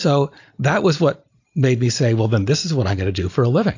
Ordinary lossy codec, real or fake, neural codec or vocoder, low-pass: AAC, 48 kbps; real; none; 7.2 kHz